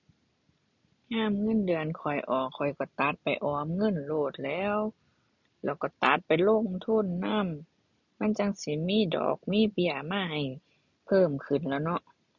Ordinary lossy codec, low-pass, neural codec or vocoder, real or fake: none; 7.2 kHz; none; real